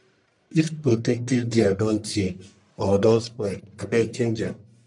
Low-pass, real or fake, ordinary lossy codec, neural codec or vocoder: 10.8 kHz; fake; none; codec, 44.1 kHz, 1.7 kbps, Pupu-Codec